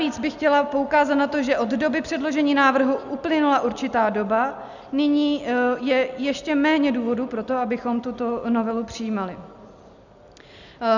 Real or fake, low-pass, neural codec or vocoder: real; 7.2 kHz; none